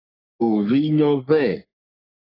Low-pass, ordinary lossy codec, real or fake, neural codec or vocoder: 5.4 kHz; AAC, 24 kbps; fake; codec, 44.1 kHz, 3.4 kbps, Pupu-Codec